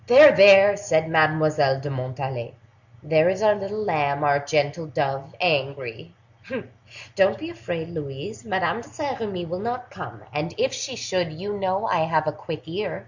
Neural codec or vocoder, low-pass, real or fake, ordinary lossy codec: none; 7.2 kHz; real; Opus, 64 kbps